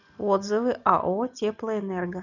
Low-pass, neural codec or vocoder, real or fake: 7.2 kHz; none; real